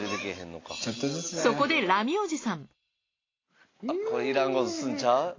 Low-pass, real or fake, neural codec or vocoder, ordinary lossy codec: 7.2 kHz; real; none; AAC, 32 kbps